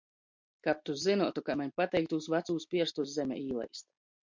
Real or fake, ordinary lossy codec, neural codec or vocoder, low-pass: real; MP3, 48 kbps; none; 7.2 kHz